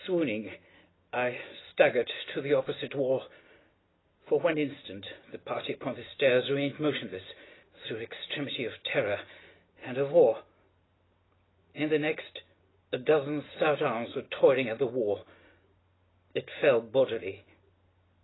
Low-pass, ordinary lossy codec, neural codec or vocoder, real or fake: 7.2 kHz; AAC, 16 kbps; none; real